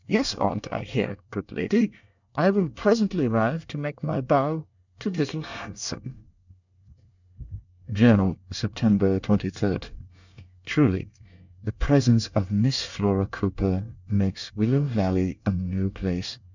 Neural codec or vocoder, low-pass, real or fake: codec, 24 kHz, 1 kbps, SNAC; 7.2 kHz; fake